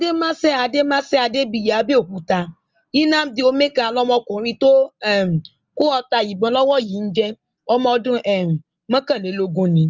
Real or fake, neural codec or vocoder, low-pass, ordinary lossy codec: real; none; 7.2 kHz; Opus, 32 kbps